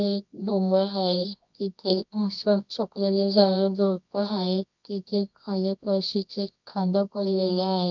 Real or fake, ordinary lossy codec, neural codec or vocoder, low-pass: fake; none; codec, 24 kHz, 0.9 kbps, WavTokenizer, medium music audio release; 7.2 kHz